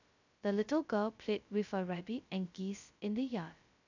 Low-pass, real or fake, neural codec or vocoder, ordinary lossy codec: 7.2 kHz; fake; codec, 16 kHz, 0.2 kbps, FocalCodec; none